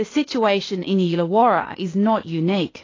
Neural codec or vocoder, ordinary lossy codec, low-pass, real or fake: codec, 16 kHz, 0.8 kbps, ZipCodec; AAC, 32 kbps; 7.2 kHz; fake